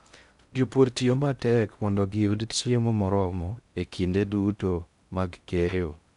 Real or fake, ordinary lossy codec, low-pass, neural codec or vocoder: fake; none; 10.8 kHz; codec, 16 kHz in and 24 kHz out, 0.6 kbps, FocalCodec, streaming, 2048 codes